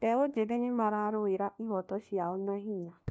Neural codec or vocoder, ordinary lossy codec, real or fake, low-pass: codec, 16 kHz, 1 kbps, FunCodec, trained on LibriTTS, 50 frames a second; none; fake; none